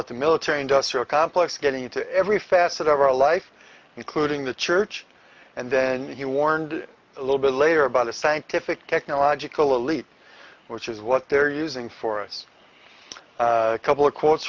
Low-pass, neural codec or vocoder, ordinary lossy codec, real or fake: 7.2 kHz; none; Opus, 24 kbps; real